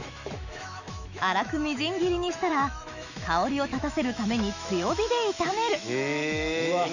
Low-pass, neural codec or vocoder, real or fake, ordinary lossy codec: 7.2 kHz; autoencoder, 48 kHz, 128 numbers a frame, DAC-VAE, trained on Japanese speech; fake; none